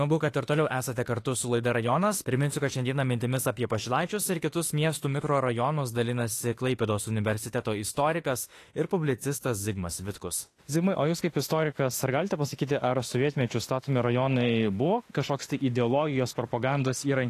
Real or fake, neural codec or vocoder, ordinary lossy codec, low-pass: fake; autoencoder, 48 kHz, 32 numbers a frame, DAC-VAE, trained on Japanese speech; AAC, 48 kbps; 14.4 kHz